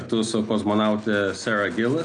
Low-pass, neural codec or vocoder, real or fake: 9.9 kHz; none; real